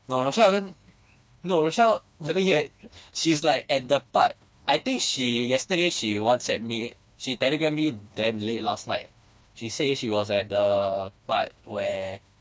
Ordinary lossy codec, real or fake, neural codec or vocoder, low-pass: none; fake; codec, 16 kHz, 2 kbps, FreqCodec, smaller model; none